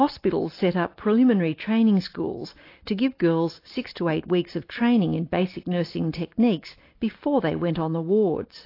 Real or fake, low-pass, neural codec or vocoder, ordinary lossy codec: real; 5.4 kHz; none; AAC, 32 kbps